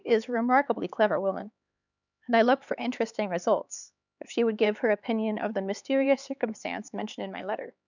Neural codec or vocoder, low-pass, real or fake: codec, 16 kHz, 4 kbps, X-Codec, HuBERT features, trained on LibriSpeech; 7.2 kHz; fake